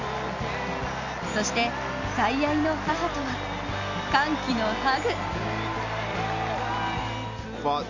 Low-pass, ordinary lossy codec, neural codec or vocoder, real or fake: 7.2 kHz; none; none; real